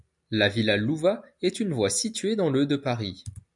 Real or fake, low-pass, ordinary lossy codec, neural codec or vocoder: real; 10.8 kHz; MP3, 96 kbps; none